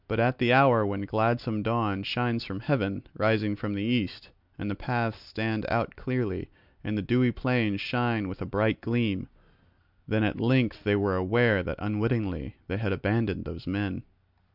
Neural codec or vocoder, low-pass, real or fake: none; 5.4 kHz; real